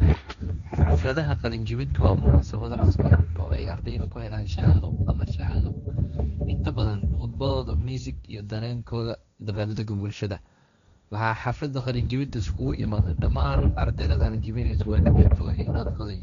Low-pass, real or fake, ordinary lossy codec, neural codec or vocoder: 7.2 kHz; fake; none; codec, 16 kHz, 1.1 kbps, Voila-Tokenizer